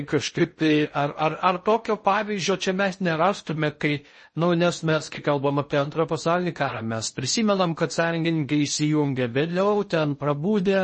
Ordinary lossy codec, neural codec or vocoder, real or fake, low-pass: MP3, 32 kbps; codec, 16 kHz in and 24 kHz out, 0.6 kbps, FocalCodec, streaming, 4096 codes; fake; 10.8 kHz